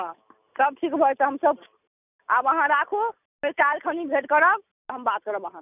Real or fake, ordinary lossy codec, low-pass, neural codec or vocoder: real; none; 3.6 kHz; none